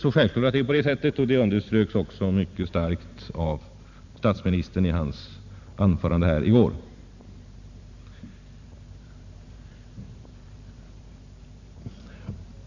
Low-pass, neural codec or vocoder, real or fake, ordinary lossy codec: 7.2 kHz; vocoder, 44.1 kHz, 128 mel bands every 512 samples, BigVGAN v2; fake; none